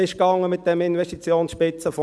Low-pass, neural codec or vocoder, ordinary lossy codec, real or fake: none; none; none; real